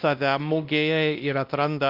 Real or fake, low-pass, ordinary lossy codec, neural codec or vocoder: fake; 5.4 kHz; Opus, 32 kbps; codec, 16 kHz, 1 kbps, X-Codec, WavLM features, trained on Multilingual LibriSpeech